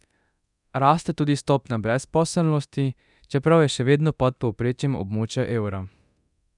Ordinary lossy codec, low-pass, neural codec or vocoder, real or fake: none; 10.8 kHz; codec, 24 kHz, 0.9 kbps, DualCodec; fake